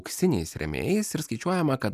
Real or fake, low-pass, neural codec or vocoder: real; 14.4 kHz; none